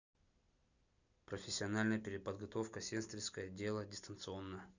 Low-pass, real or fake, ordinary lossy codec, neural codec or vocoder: 7.2 kHz; real; none; none